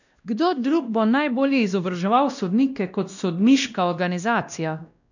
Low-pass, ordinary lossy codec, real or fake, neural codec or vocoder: 7.2 kHz; none; fake; codec, 16 kHz, 1 kbps, X-Codec, WavLM features, trained on Multilingual LibriSpeech